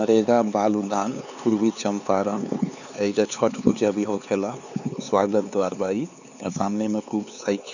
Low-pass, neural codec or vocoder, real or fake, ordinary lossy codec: 7.2 kHz; codec, 16 kHz, 4 kbps, X-Codec, HuBERT features, trained on LibriSpeech; fake; none